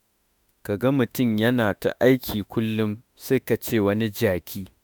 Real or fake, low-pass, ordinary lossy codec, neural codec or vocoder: fake; none; none; autoencoder, 48 kHz, 32 numbers a frame, DAC-VAE, trained on Japanese speech